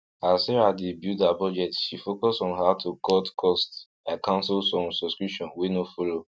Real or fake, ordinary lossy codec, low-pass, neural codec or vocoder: real; none; none; none